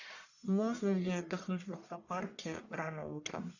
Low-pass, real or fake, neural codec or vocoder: 7.2 kHz; fake; codec, 44.1 kHz, 1.7 kbps, Pupu-Codec